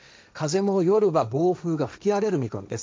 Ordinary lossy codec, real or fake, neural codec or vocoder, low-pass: none; fake; codec, 16 kHz, 1.1 kbps, Voila-Tokenizer; none